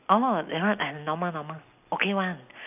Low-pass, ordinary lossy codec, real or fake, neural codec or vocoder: 3.6 kHz; none; real; none